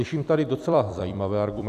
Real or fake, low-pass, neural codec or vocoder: fake; 14.4 kHz; vocoder, 44.1 kHz, 128 mel bands every 256 samples, BigVGAN v2